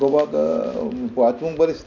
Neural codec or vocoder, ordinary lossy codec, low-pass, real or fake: none; none; 7.2 kHz; real